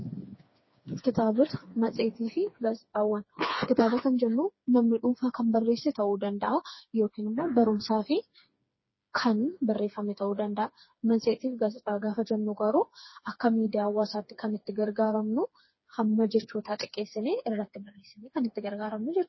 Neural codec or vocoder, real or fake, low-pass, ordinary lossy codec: codec, 16 kHz, 4 kbps, FreqCodec, smaller model; fake; 7.2 kHz; MP3, 24 kbps